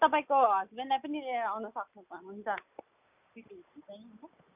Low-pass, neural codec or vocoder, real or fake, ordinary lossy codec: 3.6 kHz; none; real; none